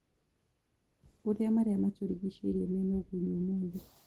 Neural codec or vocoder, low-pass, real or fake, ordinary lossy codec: none; 19.8 kHz; real; Opus, 16 kbps